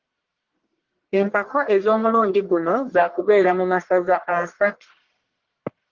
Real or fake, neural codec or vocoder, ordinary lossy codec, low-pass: fake; codec, 44.1 kHz, 1.7 kbps, Pupu-Codec; Opus, 16 kbps; 7.2 kHz